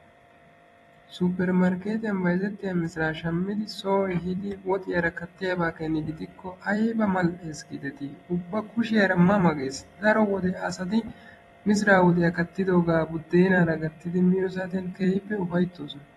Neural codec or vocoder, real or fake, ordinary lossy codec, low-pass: vocoder, 44.1 kHz, 128 mel bands every 256 samples, BigVGAN v2; fake; AAC, 32 kbps; 19.8 kHz